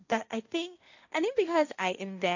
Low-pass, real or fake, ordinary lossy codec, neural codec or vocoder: 7.2 kHz; fake; none; codec, 16 kHz, 1.1 kbps, Voila-Tokenizer